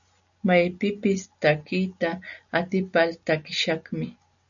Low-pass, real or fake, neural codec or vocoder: 7.2 kHz; real; none